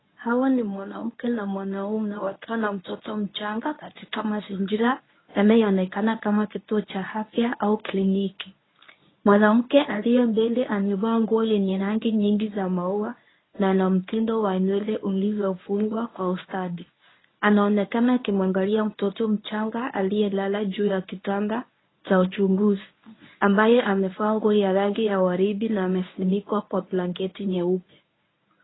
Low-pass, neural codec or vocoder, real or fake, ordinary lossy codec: 7.2 kHz; codec, 24 kHz, 0.9 kbps, WavTokenizer, medium speech release version 1; fake; AAC, 16 kbps